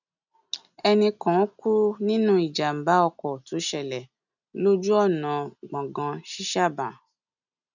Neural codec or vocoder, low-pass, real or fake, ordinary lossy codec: none; 7.2 kHz; real; none